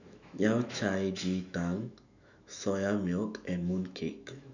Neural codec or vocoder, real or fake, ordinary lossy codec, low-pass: autoencoder, 48 kHz, 128 numbers a frame, DAC-VAE, trained on Japanese speech; fake; none; 7.2 kHz